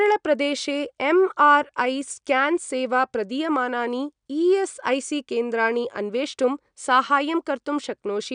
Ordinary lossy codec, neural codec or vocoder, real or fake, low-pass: none; none; real; 9.9 kHz